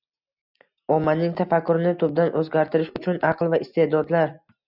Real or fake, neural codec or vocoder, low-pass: real; none; 5.4 kHz